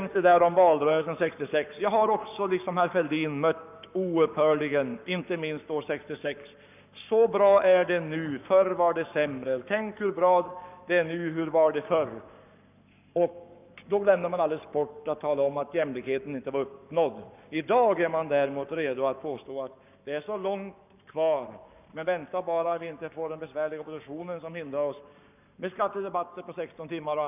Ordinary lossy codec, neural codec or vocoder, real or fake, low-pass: none; codec, 44.1 kHz, 7.8 kbps, Pupu-Codec; fake; 3.6 kHz